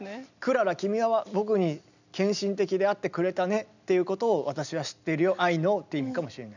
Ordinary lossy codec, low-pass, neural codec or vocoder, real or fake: none; 7.2 kHz; none; real